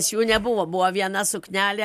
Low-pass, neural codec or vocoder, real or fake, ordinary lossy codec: 14.4 kHz; none; real; MP3, 96 kbps